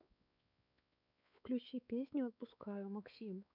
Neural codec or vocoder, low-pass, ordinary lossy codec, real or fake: codec, 16 kHz, 4 kbps, X-Codec, WavLM features, trained on Multilingual LibriSpeech; 5.4 kHz; none; fake